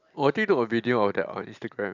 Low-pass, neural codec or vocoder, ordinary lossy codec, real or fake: 7.2 kHz; none; none; real